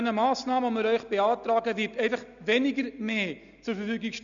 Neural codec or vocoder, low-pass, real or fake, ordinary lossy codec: none; 7.2 kHz; real; none